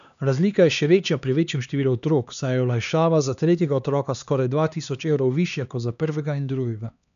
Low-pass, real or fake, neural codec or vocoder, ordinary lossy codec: 7.2 kHz; fake; codec, 16 kHz, 2 kbps, X-Codec, HuBERT features, trained on LibriSpeech; none